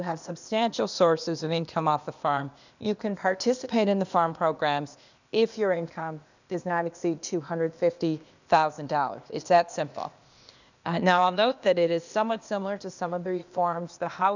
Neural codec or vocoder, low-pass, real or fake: codec, 16 kHz, 0.8 kbps, ZipCodec; 7.2 kHz; fake